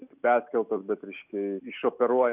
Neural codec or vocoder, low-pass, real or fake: none; 3.6 kHz; real